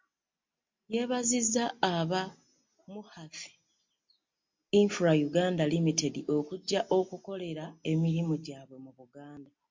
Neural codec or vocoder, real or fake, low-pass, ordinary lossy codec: none; real; 7.2 kHz; MP3, 48 kbps